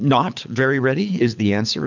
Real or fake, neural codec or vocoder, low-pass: fake; codec, 24 kHz, 6 kbps, HILCodec; 7.2 kHz